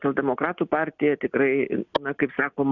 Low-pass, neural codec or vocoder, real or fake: 7.2 kHz; none; real